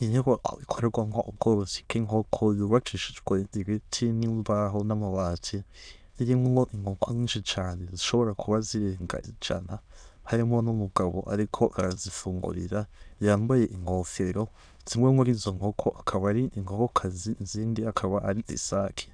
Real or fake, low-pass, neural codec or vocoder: fake; 9.9 kHz; autoencoder, 22.05 kHz, a latent of 192 numbers a frame, VITS, trained on many speakers